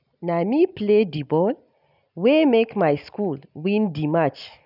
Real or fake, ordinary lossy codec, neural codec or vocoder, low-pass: real; none; none; 5.4 kHz